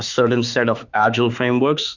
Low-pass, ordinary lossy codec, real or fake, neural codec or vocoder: 7.2 kHz; Opus, 64 kbps; fake; autoencoder, 48 kHz, 32 numbers a frame, DAC-VAE, trained on Japanese speech